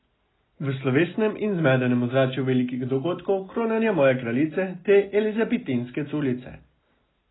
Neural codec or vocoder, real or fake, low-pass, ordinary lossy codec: none; real; 7.2 kHz; AAC, 16 kbps